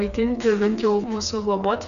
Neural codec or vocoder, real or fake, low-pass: codec, 16 kHz, 4 kbps, FreqCodec, smaller model; fake; 7.2 kHz